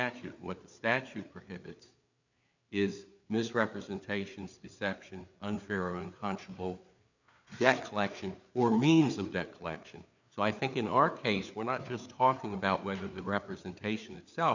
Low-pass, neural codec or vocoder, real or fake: 7.2 kHz; codec, 16 kHz, 4 kbps, FreqCodec, larger model; fake